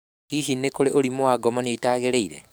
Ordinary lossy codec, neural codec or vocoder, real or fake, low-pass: none; codec, 44.1 kHz, 7.8 kbps, DAC; fake; none